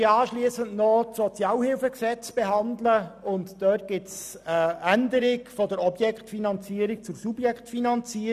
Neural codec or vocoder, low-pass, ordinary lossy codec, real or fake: none; 14.4 kHz; none; real